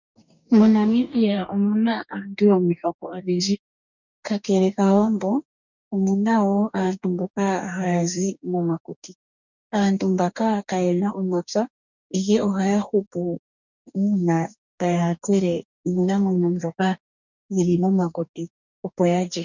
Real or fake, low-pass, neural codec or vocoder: fake; 7.2 kHz; codec, 44.1 kHz, 2.6 kbps, DAC